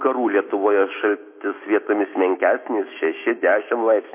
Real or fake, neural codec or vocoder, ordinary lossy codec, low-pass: fake; autoencoder, 48 kHz, 128 numbers a frame, DAC-VAE, trained on Japanese speech; MP3, 24 kbps; 3.6 kHz